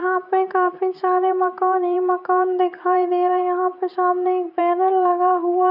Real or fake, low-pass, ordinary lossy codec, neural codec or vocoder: fake; 5.4 kHz; none; vocoder, 44.1 kHz, 128 mel bands every 512 samples, BigVGAN v2